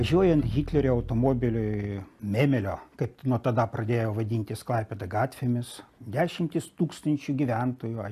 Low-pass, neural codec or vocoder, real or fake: 14.4 kHz; none; real